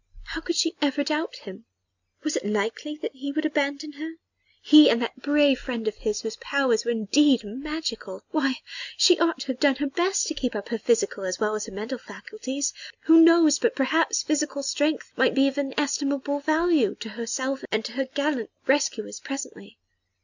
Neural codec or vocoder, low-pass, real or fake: none; 7.2 kHz; real